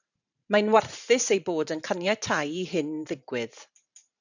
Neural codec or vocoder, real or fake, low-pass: vocoder, 44.1 kHz, 128 mel bands every 512 samples, BigVGAN v2; fake; 7.2 kHz